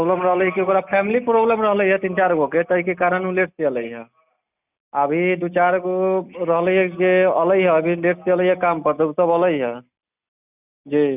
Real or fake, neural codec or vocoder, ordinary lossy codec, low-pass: real; none; none; 3.6 kHz